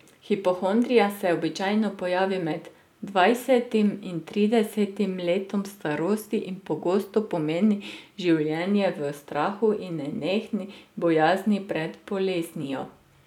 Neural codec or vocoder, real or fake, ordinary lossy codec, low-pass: none; real; none; 19.8 kHz